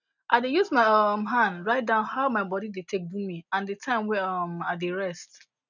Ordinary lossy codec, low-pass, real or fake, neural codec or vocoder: none; 7.2 kHz; real; none